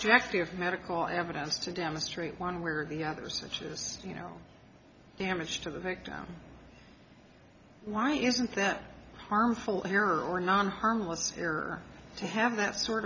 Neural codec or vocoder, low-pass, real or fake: none; 7.2 kHz; real